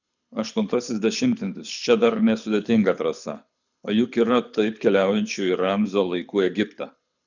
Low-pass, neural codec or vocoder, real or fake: 7.2 kHz; codec, 24 kHz, 6 kbps, HILCodec; fake